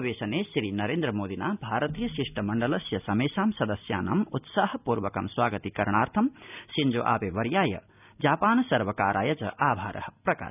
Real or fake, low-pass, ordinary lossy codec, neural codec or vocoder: real; 3.6 kHz; none; none